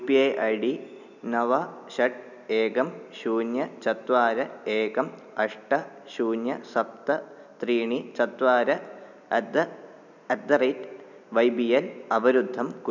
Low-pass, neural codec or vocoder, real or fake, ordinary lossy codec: 7.2 kHz; none; real; none